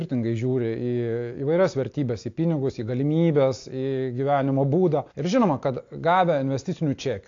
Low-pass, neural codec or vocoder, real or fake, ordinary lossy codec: 7.2 kHz; none; real; AAC, 48 kbps